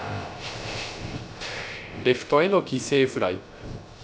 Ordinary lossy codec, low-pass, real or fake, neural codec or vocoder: none; none; fake; codec, 16 kHz, 0.3 kbps, FocalCodec